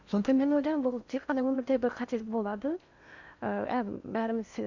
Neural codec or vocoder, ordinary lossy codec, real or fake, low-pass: codec, 16 kHz in and 24 kHz out, 0.8 kbps, FocalCodec, streaming, 65536 codes; none; fake; 7.2 kHz